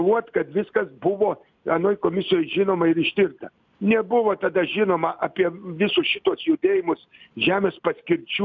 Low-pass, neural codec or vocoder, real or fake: 7.2 kHz; none; real